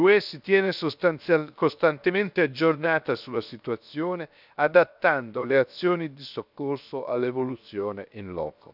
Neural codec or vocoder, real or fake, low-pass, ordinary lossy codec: codec, 16 kHz, 0.7 kbps, FocalCodec; fake; 5.4 kHz; none